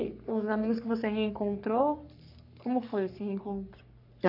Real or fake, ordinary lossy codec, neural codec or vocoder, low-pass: fake; none; codec, 44.1 kHz, 3.4 kbps, Pupu-Codec; 5.4 kHz